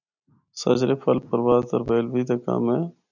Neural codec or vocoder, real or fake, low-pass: none; real; 7.2 kHz